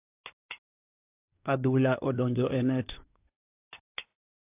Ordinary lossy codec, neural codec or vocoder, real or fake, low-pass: AAC, 24 kbps; codec, 24 kHz, 1 kbps, SNAC; fake; 3.6 kHz